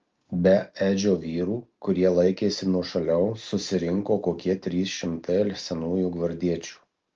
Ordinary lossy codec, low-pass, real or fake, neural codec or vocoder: Opus, 24 kbps; 7.2 kHz; real; none